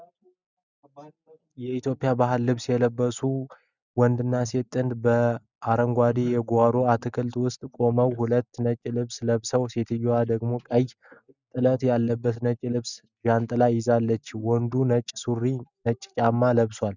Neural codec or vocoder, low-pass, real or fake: none; 7.2 kHz; real